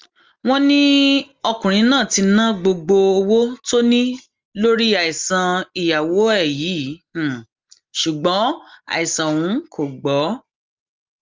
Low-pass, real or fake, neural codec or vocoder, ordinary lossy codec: 7.2 kHz; real; none; Opus, 32 kbps